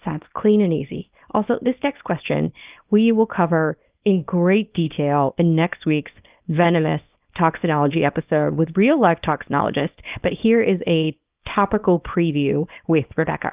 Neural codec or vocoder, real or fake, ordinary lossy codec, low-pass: codec, 24 kHz, 0.9 kbps, WavTokenizer, small release; fake; Opus, 24 kbps; 3.6 kHz